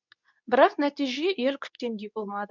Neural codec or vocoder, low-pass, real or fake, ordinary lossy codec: codec, 24 kHz, 0.9 kbps, WavTokenizer, medium speech release version 2; 7.2 kHz; fake; none